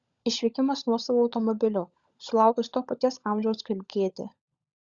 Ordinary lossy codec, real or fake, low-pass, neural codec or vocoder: Opus, 64 kbps; fake; 7.2 kHz; codec, 16 kHz, 16 kbps, FunCodec, trained on LibriTTS, 50 frames a second